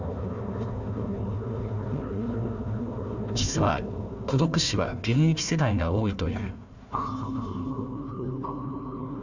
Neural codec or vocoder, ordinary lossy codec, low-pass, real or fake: codec, 16 kHz, 1 kbps, FunCodec, trained on Chinese and English, 50 frames a second; none; 7.2 kHz; fake